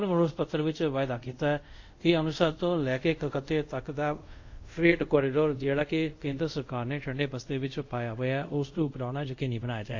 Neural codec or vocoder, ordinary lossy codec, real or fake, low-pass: codec, 24 kHz, 0.5 kbps, DualCodec; AAC, 48 kbps; fake; 7.2 kHz